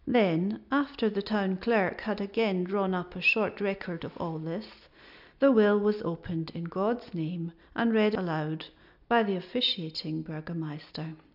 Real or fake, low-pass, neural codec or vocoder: real; 5.4 kHz; none